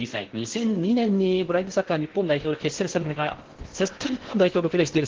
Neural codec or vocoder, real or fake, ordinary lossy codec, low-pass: codec, 16 kHz in and 24 kHz out, 0.6 kbps, FocalCodec, streaming, 4096 codes; fake; Opus, 16 kbps; 7.2 kHz